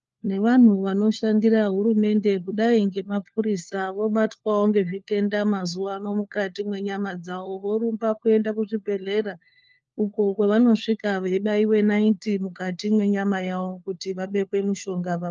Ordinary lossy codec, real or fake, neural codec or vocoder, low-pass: Opus, 24 kbps; fake; codec, 16 kHz, 4 kbps, FunCodec, trained on LibriTTS, 50 frames a second; 7.2 kHz